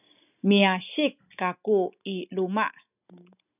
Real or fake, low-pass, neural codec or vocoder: real; 3.6 kHz; none